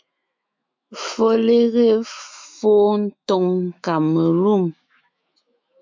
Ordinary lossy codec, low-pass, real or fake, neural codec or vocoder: MP3, 64 kbps; 7.2 kHz; fake; autoencoder, 48 kHz, 128 numbers a frame, DAC-VAE, trained on Japanese speech